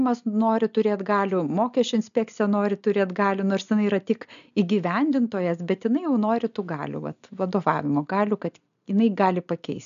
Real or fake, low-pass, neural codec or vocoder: real; 7.2 kHz; none